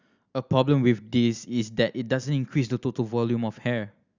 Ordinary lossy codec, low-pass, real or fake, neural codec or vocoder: Opus, 64 kbps; 7.2 kHz; real; none